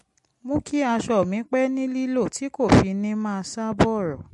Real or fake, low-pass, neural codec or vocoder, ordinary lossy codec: real; 14.4 kHz; none; MP3, 48 kbps